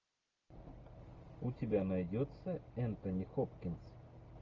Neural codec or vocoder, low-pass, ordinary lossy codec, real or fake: none; 7.2 kHz; Opus, 32 kbps; real